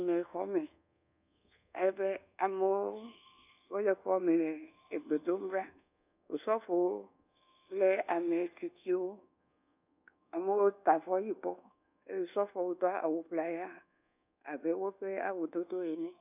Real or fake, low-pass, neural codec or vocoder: fake; 3.6 kHz; codec, 24 kHz, 1.2 kbps, DualCodec